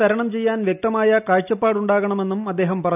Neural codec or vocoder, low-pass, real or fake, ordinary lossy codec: none; 3.6 kHz; real; none